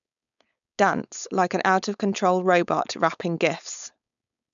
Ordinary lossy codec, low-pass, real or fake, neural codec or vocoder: none; 7.2 kHz; fake; codec, 16 kHz, 4.8 kbps, FACodec